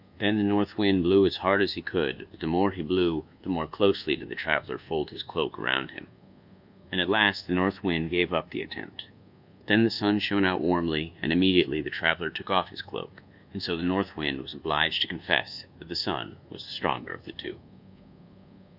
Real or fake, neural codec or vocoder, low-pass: fake; codec, 24 kHz, 1.2 kbps, DualCodec; 5.4 kHz